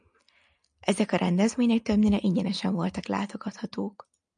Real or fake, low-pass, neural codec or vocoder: real; 9.9 kHz; none